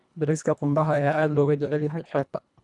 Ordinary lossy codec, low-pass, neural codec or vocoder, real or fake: none; 10.8 kHz; codec, 24 kHz, 1.5 kbps, HILCodec; fake